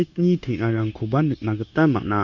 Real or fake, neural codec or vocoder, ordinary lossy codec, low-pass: fake; codec, 16 kHz, 0.9 kbps, LongCat-Audio-Codec; none; 7.2 kHz